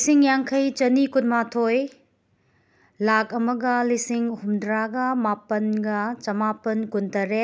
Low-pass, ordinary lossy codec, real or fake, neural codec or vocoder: none; none; real; none